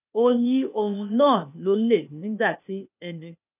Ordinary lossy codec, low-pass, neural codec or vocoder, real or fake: none; 3.6 kHz; codec, 16 kHz, 0.8 kbps, ZipCodec; fake